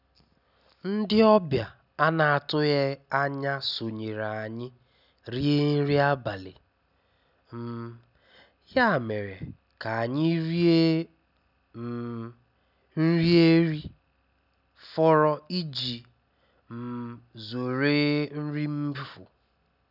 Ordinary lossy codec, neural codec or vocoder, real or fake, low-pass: none; none; real; 5.4 kHz